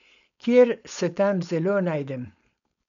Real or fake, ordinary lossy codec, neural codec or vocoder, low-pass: fake; none; codec, 16 kHz, 4.8 kbps, FACodec; 7.2 kHz